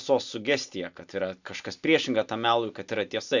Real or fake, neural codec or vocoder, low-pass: real; none; 7.2 kHz